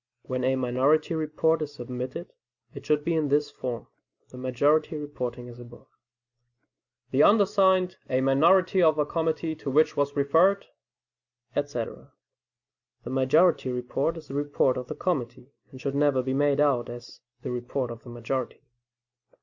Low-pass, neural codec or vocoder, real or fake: 7.2 kHz; none; real